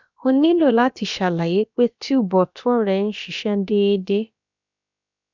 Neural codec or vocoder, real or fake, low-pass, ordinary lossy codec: codec, 16 kHz, about 1 kbps, DyCAST, with the encoder's durations; fake; 7.2 kHz; none